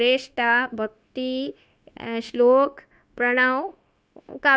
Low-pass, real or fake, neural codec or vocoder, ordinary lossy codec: none; fake; codec, 16 kHz, 0.9 kbps, LongCat-Audio-Codec; none